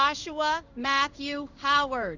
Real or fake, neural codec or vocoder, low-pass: fake; codec, 16 kHz in and 24 kHz out, 1 kbps, XY-Tokenizer; 7.2 kHz